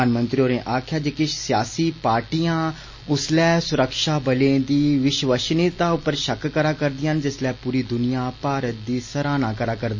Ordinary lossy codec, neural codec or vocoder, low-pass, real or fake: MP3, 32 kbps; none; 7.2 kHz; real